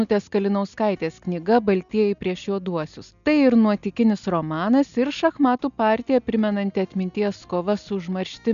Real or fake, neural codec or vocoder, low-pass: real; none; 7.2 kHz